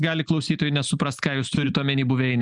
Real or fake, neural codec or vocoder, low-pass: real; none; 10.8 kHz